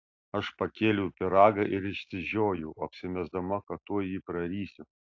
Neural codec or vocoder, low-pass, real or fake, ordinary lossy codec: none; 7.2 kHz; real; AAC, 48 kbps